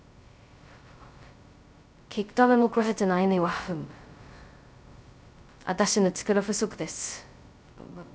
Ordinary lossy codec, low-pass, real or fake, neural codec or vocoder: none; none; fake; codec, 16 kHz, 0.2 kbps, FocalCodec